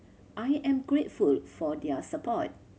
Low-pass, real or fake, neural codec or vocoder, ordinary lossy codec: none; real; none; none